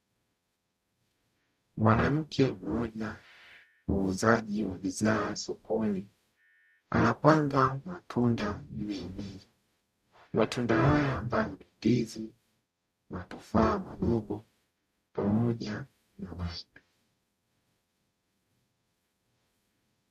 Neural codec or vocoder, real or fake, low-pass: codec, 44.1 kHz, 0.9 kbps, DAC; fake; 14.4 kHz